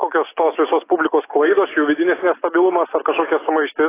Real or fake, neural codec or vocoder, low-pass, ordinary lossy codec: real; none; 3.6 kHz; AAC, 16 kbps